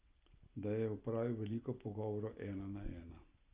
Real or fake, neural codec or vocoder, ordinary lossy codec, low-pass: real; none; Opus, 32 kbps; 3.6 kHz